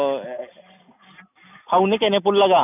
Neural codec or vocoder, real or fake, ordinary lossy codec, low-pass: none; real; none; 3.6 kHz